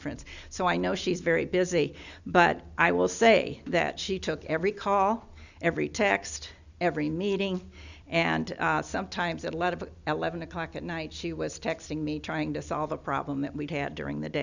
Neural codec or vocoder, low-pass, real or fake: none; 7.2 kHz; real